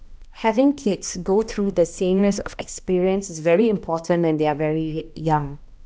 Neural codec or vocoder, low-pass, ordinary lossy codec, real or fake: codec, 16 kHz, 1 kbps, X-Codec, HuBERT features, trained on balanced general audio; none; none; fake